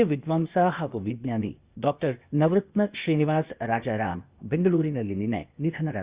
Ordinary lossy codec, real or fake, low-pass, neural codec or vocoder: Opus, 64 kbps; fake; 3.6 kHz; codec, 16 kHz, 0.8 kbps, ZipCodec